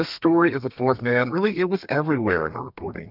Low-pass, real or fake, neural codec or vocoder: 5.4 kHz; fake; codec, 32 kHz, 1.9 kbps, SNAC